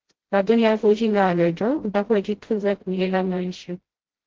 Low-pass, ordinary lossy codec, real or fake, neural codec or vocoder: 7.2 kHz; Opus, 16 kbps; fake; codec, 16 kHz, 0.5 kbps, FreqCodec, smaller model